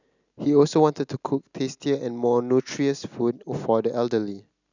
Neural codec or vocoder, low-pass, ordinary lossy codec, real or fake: none; 7.2 kHz; none; real